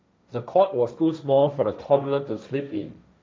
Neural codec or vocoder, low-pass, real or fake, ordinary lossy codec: codec, 16 kHz, 1.1 kbps, Voila-Tokenizer; none; fake; none